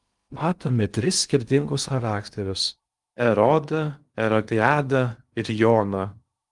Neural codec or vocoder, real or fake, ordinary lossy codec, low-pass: codec, 16 kHz in and 24 kHz out, 0.6 kbps, FocalCodec, streaming, 2048 codes; fake; Opus, 32 kbps; 10.8 kHz